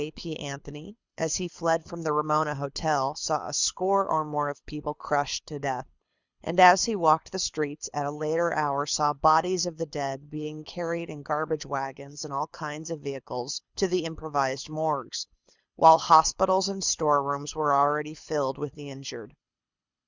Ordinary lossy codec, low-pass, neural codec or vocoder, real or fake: Opus, 64 kbps; 7.2 kHz; codec, 24 kHz, 6 kbps, HILCodec; fake